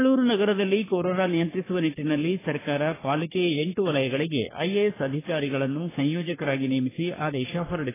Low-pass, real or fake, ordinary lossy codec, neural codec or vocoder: 3.6 kHz; fake; AAC, 16 kbps; codec, 44.1 kHz, 3.4 kbps, Pupu-Codec